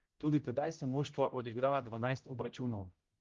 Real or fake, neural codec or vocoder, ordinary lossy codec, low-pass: fake; codec, 16 kHz, 0.5 kbps, X-Codec, HuBERT features, trained on general audio; Opus, 32 kbps; 7.2 kHz